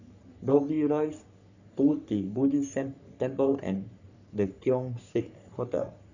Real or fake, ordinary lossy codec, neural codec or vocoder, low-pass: fake; none; codec, 44.1 kHz, 3.4 kbps, Pupu-Codec; 7.2 kHz